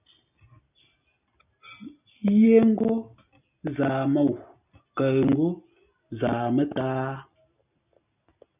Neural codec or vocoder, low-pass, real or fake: none; 3.6 kHz; real